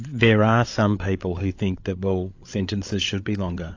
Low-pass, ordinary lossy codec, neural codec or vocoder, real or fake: 7.2 kHz; AAC, 48 kbps; codec, 16 kHz, 8 kbps, FreqCodec, larger model; fake